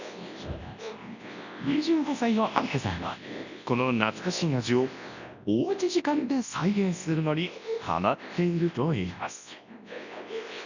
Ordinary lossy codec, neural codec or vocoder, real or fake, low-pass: none; codec, 24 kHz, 0.9 kbps, WavTokenizer, large speech release; fake; 7.2 kHz